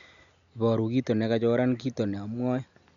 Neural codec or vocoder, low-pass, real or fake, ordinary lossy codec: none; 7.2 kHz; real; none